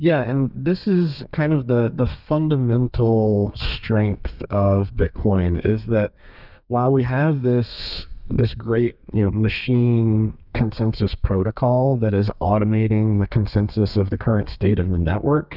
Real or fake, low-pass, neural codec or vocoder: fake; 5.4 kHz; codec, 32 kHz, 1.9 kbps, SNAC